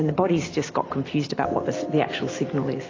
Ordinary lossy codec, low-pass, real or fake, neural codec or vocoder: AAC, 32 kbps; 7.2 kHz; real; none